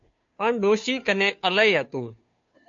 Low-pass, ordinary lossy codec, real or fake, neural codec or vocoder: 7.2 kHz; MP3, 48 kbps; fake; codec, 16 kHz, 2 kbps, FunCodec, trained on Chinese and English, 25 frames a second